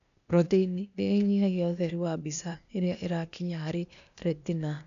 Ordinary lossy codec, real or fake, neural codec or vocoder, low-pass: none; fake; codec, 16 kHz, 0.8 kbps, ZipCodec; 7.2 kHz